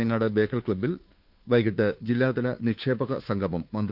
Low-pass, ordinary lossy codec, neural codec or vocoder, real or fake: 5.4 kHz; none; codec, 16 kHz, 2 kbps, FunCodec, trained on Chinese and English, 25 frames a second; fake